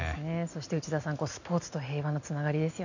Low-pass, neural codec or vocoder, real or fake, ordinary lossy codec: 7.2 kHz; none; real; none